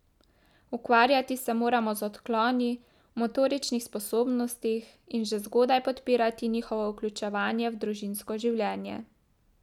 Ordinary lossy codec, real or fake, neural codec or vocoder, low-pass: none; real; none; 19.8 kHz